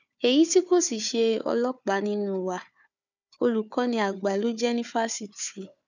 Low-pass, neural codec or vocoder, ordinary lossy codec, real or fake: 7.2 kHz; codec, 16 kHz, 4 kbps, FunCodec, trained on Chinese and English, 50 frames a second; none; fake